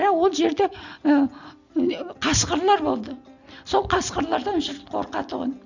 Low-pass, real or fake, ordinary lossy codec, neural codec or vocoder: 7.2 kHz; real; none; none